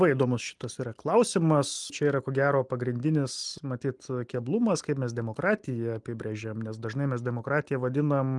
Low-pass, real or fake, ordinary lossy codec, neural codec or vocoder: 10.8 kHz; real; Opus, 24 kbps; none